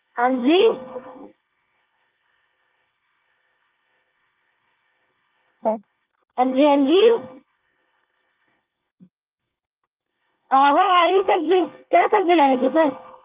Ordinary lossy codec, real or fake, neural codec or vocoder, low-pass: Opus, 24 kbps; fake; codec, 24 kHz, 1 kbps, SNAC; 3.6 kHz